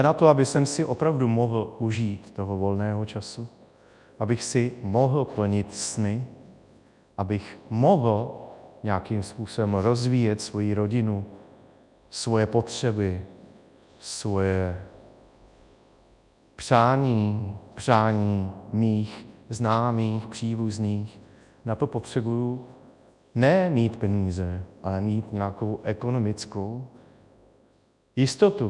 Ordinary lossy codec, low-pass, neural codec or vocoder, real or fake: MP3, 96 kbps; 10.8 kHz; codec, 24 kHz, 0.9 kbps, WavTokenizer, large speech release; fake